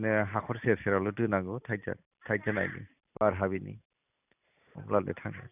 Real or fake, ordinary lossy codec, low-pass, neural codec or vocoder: real; none; 3.6 kHz; none